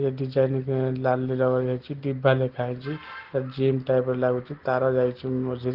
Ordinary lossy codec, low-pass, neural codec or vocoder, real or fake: Opus, 16 kbps; 5.4 kHz; none; real